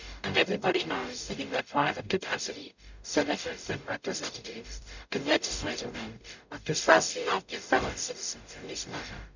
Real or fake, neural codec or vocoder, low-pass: fake; codec, 44.1 kHz, 0.9 kbps, DAC; 7.2 kHz